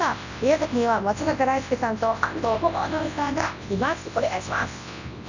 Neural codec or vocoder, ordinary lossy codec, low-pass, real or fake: codec, 24 kHz, 0.9 kbps, WavTokenizer, large speech release; none; 7.2 kHz; fake